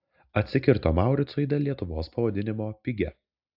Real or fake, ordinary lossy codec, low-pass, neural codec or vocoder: real; AAC, 48 kbps; 5.4 kHz; none